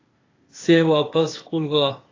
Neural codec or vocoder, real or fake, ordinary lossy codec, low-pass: codec, 16 kHz, 0.8 kbps, ZipCodec; fake; AAC, 32 kbps; 7.2 kHz